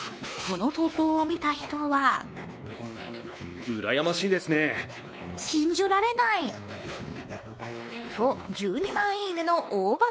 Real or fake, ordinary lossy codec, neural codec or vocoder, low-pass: fake; none; codec, 16 kHz, 2 kbps, X-Codec, WavLM features, trained on Multilingual LibriSpeech; none